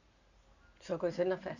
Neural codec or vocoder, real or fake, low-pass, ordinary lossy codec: none; real; 7.2 kHz; none